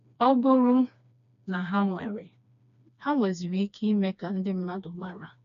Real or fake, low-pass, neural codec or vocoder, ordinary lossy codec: fake; 7.2 kHz; codec, 16 kHz, 2 kbps, FreqCodec, smaller model; none